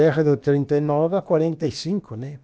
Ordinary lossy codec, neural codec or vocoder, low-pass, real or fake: none; codec, 16 kHz, about 1 kbps, DyCAST, with the encoder's durations; none; fake